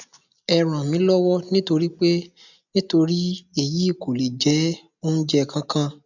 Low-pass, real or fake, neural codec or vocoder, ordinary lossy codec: 7.2 kHz; real; none; none